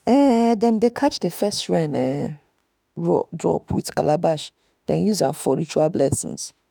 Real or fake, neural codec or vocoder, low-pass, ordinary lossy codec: fake; autoencoder, 48 kHz, 32 numbers a frame, DAC-VAE, trained on Japanese speech; none; none